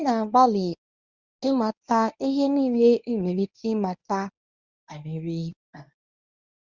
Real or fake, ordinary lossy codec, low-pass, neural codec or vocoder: fake; Opus, 64 kbps; 7.2 kHz; codec, 24 kHz, 0.9 kbps, WavTokenizer, medium speech release version 1